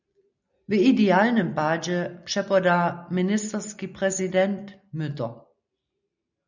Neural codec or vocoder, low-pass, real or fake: none; 7.2 kHz; real